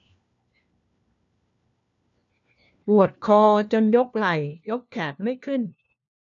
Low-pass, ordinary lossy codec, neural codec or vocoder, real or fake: 7.2 kHz; none; codec, 16 kHz, 1 kbps, FunCodec, trained on LibriTTS, 50 frames a second; fake